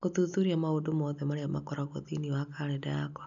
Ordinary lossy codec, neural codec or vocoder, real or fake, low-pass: none; none; real; 7.2 kHz